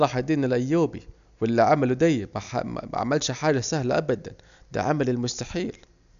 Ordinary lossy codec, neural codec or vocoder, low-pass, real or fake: none; none; 7.2 kHz; real